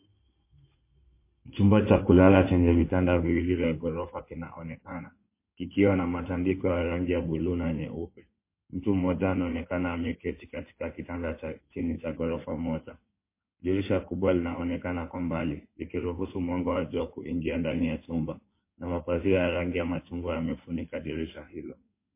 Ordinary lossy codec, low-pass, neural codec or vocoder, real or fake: MP3, 24 kbps; 3.6 kHz; codec, 16 kHz in and 24 kHz out, 2.2 kbps, FireRedTTS-2 codec; fake